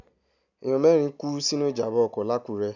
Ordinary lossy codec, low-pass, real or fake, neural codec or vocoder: none; 7.2 kHz; real; none